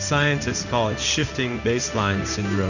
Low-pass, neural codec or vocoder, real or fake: 7.2 kHz; codec, 16 kHz in and 24 kHz out, 1 kbps, XY-Tokenizer; fake